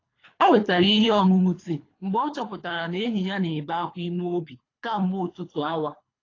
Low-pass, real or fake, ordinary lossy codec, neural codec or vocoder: 7.2 kHz; fake; AAC, 32 kbps; codec, 24 kHz, 3 kbps, HILCodec